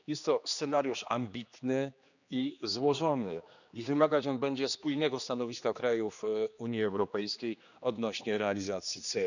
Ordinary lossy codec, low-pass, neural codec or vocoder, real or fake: none; 7.2 kHz; codec, 16 kHz, 2 kbps, X-Codec, HuBERT features, trained on general audio; fake